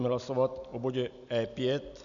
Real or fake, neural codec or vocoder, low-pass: real; none; 7.2 kHz